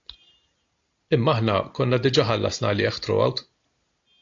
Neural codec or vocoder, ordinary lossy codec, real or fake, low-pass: none; AAC, 64 kbps; real; 7.2 kHz